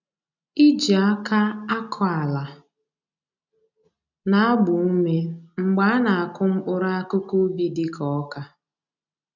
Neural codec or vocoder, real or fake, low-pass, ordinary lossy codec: none; real; 7.2 kHz; none